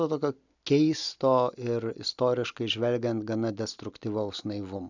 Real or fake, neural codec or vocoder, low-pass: real; none; 7.2 kHz